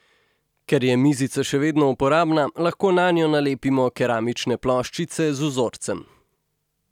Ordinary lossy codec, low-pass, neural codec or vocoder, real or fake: none; 19.8 kHz; none; real